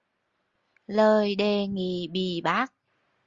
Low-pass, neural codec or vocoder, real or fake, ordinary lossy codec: 7.2 kHz; none; real; Opus, 32 kbps